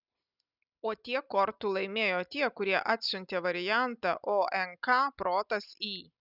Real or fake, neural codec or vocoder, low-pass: real; none; 5.4 kHz